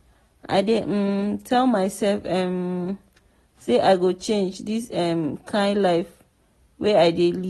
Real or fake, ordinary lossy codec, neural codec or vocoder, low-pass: real; AAC, 32 kbps; none; 14.4 kHz